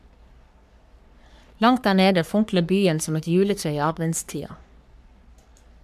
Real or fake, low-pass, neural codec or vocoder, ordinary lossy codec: fake; 14.4 kHz; codec, 44.1 kHz, 3.4 kbps, Pupu-Codec; none